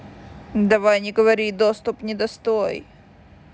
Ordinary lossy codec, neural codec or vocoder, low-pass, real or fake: none; none; none; real